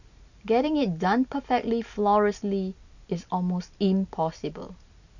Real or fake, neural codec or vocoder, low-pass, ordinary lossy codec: real; none; 7.2 kHz; none